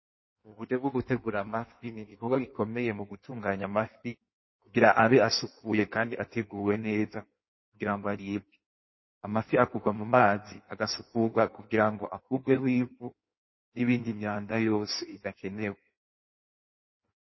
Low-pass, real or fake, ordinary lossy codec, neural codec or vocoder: 7.2 kHz; fake; MP3, 24 kbps; codec, 16 kHz in and 24 kHz out, 1.1 kbps, FireRedTTS-2 codec